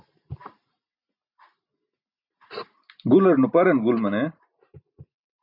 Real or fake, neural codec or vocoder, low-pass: real; none; 5.4 kHz